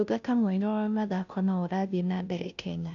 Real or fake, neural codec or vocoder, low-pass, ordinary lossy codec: fake; codec, 16 kHz, 0.5 kbps, FunCodec, trained on Chinese and English, 25 frames a second; 7.2 kHz; none